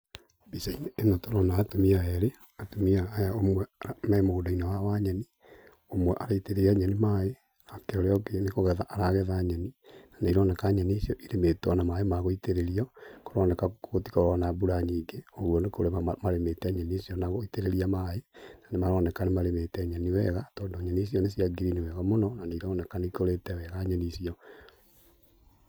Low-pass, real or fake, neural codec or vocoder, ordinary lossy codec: none; real; none; none